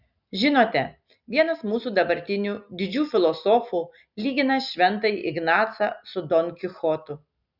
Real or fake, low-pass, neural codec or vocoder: real; 5.4 kHz; none